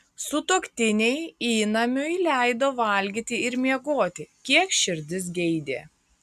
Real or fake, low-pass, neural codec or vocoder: real; 14.4 kHz; none